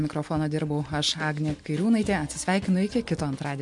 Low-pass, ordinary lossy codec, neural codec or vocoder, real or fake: 10.8 kHz; MP3, 64 kbps; none; real